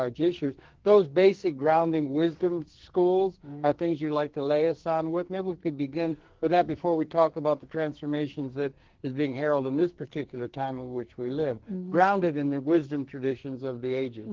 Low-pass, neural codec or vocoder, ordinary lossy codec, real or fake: 7.2 kHz; codec, 44.1 kHz, 2.6 kbps, SNAC; Opus, 16 kbps; fake